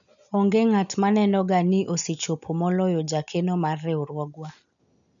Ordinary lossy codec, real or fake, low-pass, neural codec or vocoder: none; real; 7.2 kHz; none